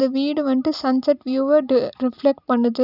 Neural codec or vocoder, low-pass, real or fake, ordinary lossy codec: none; 5.4 kHz; real; none